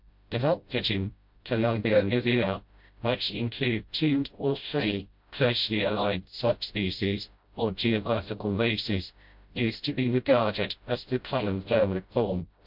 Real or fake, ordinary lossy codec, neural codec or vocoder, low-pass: fake; MP3, 48 kbps; codec, 16 kHz, 0.5 kbps, FreqCodec, smaller model; 5.4 kHz